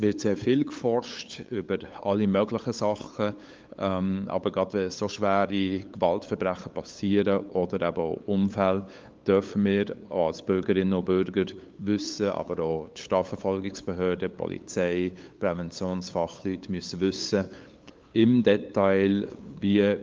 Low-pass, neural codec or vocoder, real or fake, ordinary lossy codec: 7.2 kHz; codec, 16 kHz, 8 kbps, FunCodec, trained on LibriTTS, 25 frames a second; fake; Opus, 24 kbps